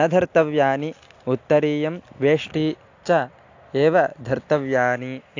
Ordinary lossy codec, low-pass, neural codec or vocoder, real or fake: AAC, 48 kbps; 7.2 kHz; none; real